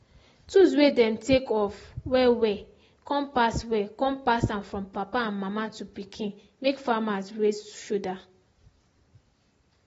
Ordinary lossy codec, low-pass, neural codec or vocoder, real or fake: AAC, 24 kbps; 19.8 kHz; none; real